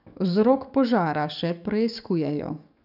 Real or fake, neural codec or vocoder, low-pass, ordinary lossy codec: fake; codec, 44.1 kHz, 7.8 kbps, DAC; 5.4 kHz; none